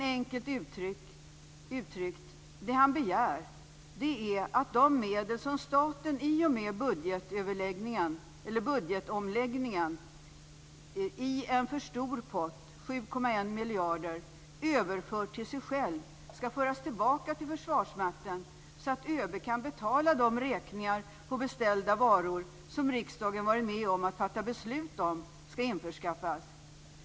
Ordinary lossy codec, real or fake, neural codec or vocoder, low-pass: none; real; none; none